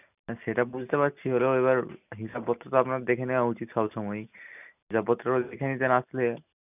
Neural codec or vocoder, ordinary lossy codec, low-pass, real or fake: none; none; 3.6 kHz; real